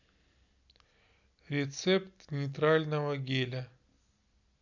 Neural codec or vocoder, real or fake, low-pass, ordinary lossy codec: none; real; 7.2 kHz; none